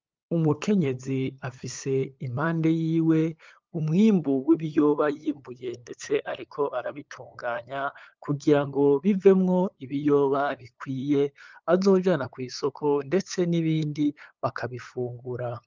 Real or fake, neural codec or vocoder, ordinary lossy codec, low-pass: fake; codec, 16 kHz, 8 kbps, FunCodec, trained on LibriTTS, 25 frames a second; Opus, 24 kbps; 7.2 kHz